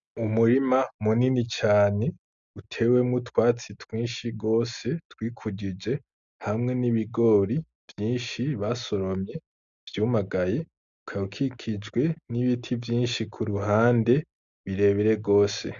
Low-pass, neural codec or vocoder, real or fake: 7.2 kHz; none; real